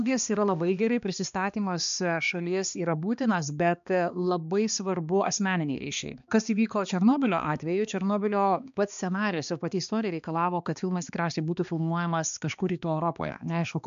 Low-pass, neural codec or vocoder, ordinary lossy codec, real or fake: 7.2 kHz; codec, 16 kHz, 2 kbps, X-Codec, HuBERT features, trained on balanced general audio; AAC, 96 kbps; fake